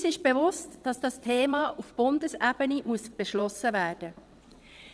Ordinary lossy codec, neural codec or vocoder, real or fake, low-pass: none; vocoder, 22.05 kHz, 80 mel bands, WaveNeXt; fake; none